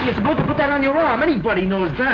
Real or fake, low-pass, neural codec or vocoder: real; 7.2 kHz; none